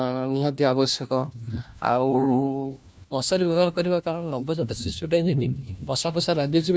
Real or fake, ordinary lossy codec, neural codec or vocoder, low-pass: fake; none; codec, 16 kHz, 1 kbps, FunCodec, trained on LibriTTS, 50 frames a second; none